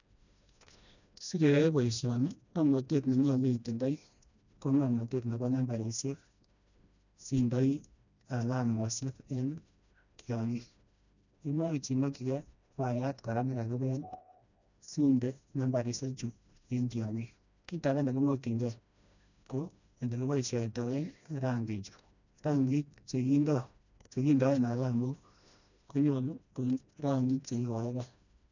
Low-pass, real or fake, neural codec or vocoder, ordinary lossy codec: 7.2 kHz; fake; codec, 16 kHz, 1 kbps, FreqCodec, smaller model; none